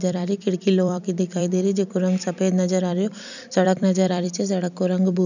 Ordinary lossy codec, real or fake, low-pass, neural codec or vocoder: none; fake; 7.2 kHz; vocoder, 44.1 kHz, 128 mel bands every 256 samples, BigVGAN v2